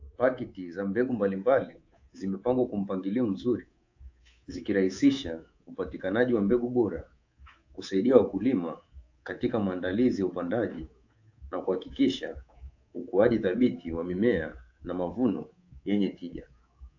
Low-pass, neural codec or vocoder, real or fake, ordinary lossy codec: 7.2 kHz; codec, 24 kHz, 3.1 kbps, DualCodec; fake; AAC, 48 kbps